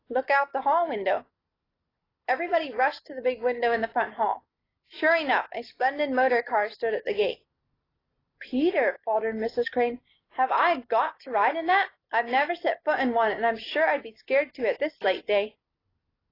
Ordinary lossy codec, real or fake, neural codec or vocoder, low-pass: AAC, 24 kbps; real; none; 5.4 kHz